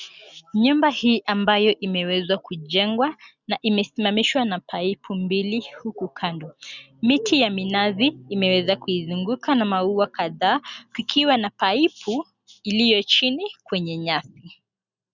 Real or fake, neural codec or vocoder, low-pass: real; none; 7.2 kHz